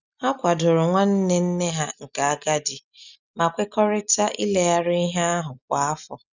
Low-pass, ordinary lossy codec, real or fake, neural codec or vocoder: 7.2 kHz; none; real; none